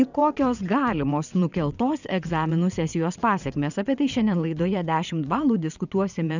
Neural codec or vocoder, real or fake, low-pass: vocoder, 22.05 kHz, 80 mel bands, WaveNeXt; fake; 7.2 kHz